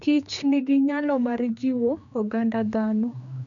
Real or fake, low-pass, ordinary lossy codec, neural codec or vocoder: fake; 7.2 kHz; none; codec, 16 kHz, 2 kbps, X-Codec, HuBERT features, trained on general audio